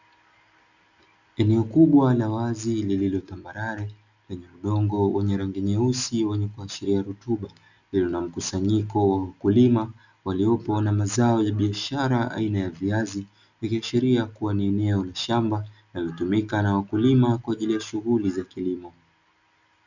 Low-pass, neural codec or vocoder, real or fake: 7.2 kHz; none; real